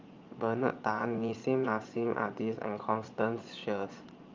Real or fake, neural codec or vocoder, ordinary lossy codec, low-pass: fake; vocoder, 22.05 kHz, 80 mel bands, Vocos; Opus, 24 kbps; 7.2 kHz